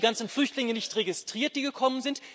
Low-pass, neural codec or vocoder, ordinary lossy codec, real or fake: none; none; none; real